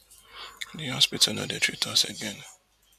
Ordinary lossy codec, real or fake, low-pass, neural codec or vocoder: MP3, 96 kbps; real; 14.4 kHz; none